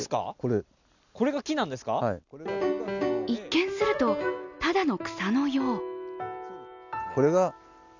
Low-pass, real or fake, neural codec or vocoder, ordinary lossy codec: 7.2 kHz; real; none; none